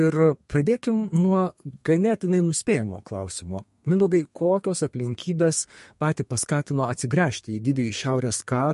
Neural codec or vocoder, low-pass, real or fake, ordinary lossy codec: codec, 32 kHz, 1.9 kbps, SNAC; 14.4 kHz; fake; MP3, 48 kbps